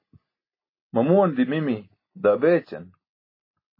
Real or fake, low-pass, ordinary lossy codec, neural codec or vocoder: real; 5.4 kHz; MP3, 24 kbps; none